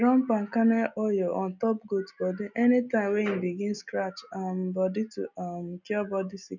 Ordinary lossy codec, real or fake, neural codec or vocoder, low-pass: none; real; none; 7.2 kHz